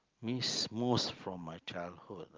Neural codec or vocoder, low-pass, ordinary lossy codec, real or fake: none; 7.2 kHz; Opus, 32 kbps; real